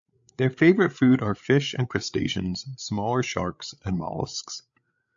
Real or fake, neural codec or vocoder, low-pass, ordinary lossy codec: fake; codec, 16 kHz, 8 kbps, FreqCodec, larger model; 7.2 kHz; AAC, 64 kbps